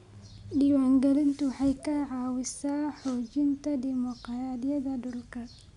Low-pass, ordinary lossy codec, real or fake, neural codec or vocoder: 10.8 kHz; none; real; none